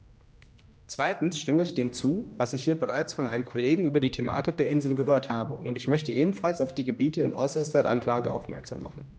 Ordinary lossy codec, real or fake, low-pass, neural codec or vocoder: none; fake; none; codec, 16 kHz, 1 kbps, X-Codec, HuBERT features, trained on general audio